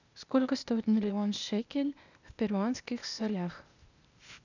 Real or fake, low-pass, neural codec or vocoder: fake; 7.2 kHz; codec, 16 kHz, 0.8 kbps, ZipCodec